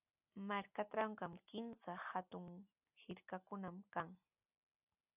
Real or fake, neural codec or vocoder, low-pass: real; none; 3.6 kHz